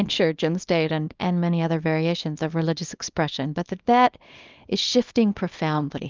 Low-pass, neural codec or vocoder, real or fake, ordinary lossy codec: 7.2 kHz; codec, 24 kHz, 0.9 kbps, WavTokenizer, small release; fake; Opus, 24 kbps